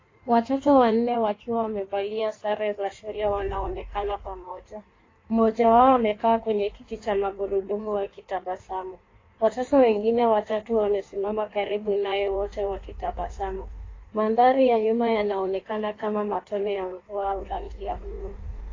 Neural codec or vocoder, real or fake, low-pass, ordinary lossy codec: codec, 16 kHz in and 24 kHz out, 1.1 kbps, FireRedTTS-2 codec; fake; 7.2 kHz; AAC, 32 kbps